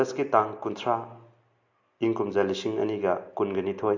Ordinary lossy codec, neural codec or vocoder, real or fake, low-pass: AAC, 48 kbps; none; real; 7.2 kHz